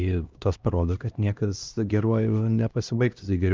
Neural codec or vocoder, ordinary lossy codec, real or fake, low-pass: codec, 16 kHz, 1 kbps, X-Codec, HuBERT features, trained on LibriSpeech; Opus, 24 kbps; fake; 7.2 kHz